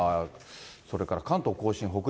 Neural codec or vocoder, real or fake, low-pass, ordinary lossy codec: none; real; none; none